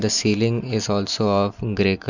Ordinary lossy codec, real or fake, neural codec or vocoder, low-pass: none; real; none; 7.2 kHz